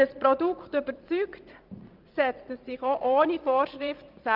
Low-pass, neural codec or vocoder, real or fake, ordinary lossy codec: 5.4 kHz; vocoder, 44.1 kHz, 80 mel bands, Vocos; fake; Opus, 24 kbps